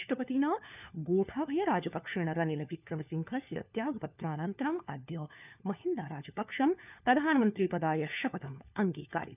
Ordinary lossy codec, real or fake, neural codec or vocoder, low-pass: none; fake; codec, 16 kHz, 4 kbps, FunCodec, trained on LibriTTS, 50 frames a second; 3.6 kHz